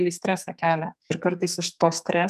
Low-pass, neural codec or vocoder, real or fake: 14.4 kHz; codec, 44.1 kHz, 2.6 kbps, SNAC; fake